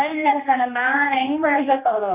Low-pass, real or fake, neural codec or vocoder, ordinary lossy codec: 3.6 kHz; fake; codec, 16 kHz, 1 kbps, X-Codec, HuBERT features, trained on general audio; none